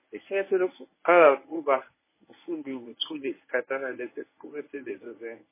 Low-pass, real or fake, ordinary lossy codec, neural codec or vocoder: 3.6 kHz; fake; MP3, 16 kbps; codec, 24 kHz, 0.9 kbps, WavTokenizer, medium speech release version 1